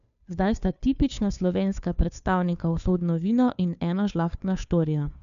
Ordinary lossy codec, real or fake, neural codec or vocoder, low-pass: none; fake; codec, 16 kHz, 2 kbps, FunCodec, trained on Chinese and English, 25 frames a second; 7.2 kHz